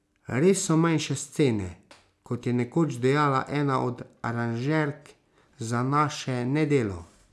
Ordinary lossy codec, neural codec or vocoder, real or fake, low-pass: none; none; real; none